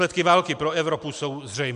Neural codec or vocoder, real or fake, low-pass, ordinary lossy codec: none; real; 10.8 kHz; MP3, 64 kbps